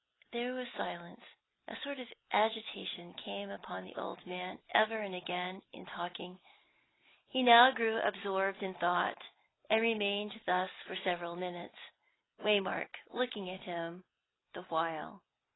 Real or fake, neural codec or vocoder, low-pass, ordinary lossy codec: real; none; 7.2 kHz; AAC, 16 kbps